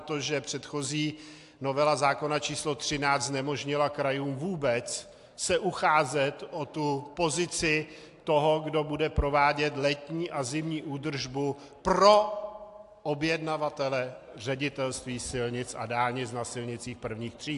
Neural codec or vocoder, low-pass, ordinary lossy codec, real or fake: none; 10.8 kHz; AAC, 64 kbps; real